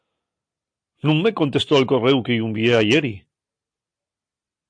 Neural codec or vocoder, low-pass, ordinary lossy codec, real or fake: none; 9.9 kHz; AAC, 64 kbps; real